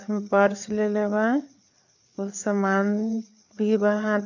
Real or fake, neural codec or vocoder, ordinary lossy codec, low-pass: fake; codec, 16 kHz, 4 kbps, FreqCodec, larger model; none; 7.2 kHz